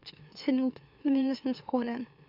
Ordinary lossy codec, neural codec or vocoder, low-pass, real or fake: none; autoencoder, 44.1 kHz, a latent of 192 numbers a frame, MeloTTS; 5.4 kHz; fake